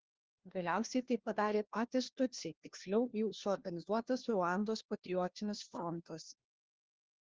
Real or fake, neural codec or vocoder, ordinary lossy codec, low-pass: fake; codec, 16 kHz, 1 kbps, FunCodec, trained on LibriTTS, 50 frames a second; Opus, 16 kbps; 7.2 kHz